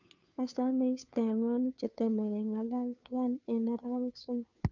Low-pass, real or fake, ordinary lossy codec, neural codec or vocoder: 7.2 kHz; fake; none; codec, 16 kHz, 2 kbps, FunCodec, trained on Chinese and English, 25 frames a second